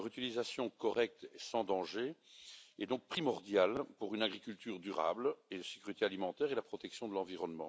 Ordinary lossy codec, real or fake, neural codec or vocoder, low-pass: none; real; none; none